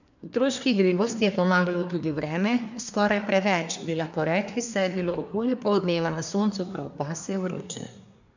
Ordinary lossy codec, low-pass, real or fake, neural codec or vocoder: none; 7.2 kHz; fake; codec, 24 kHz, 1 kbps, SNAC